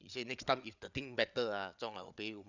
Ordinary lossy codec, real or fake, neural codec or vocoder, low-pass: none; fake; codec, 16 kHz, 8 kbps, FreqCodec, larger model; 7.2 kHz